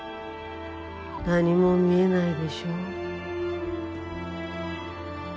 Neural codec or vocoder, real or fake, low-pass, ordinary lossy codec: none; real; none; none